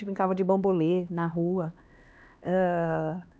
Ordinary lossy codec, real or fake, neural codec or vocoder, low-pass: none; fake; codec, 16 kHz, 2 kbps, X-Codec, HuBERT features, trained on LibriSpeech; none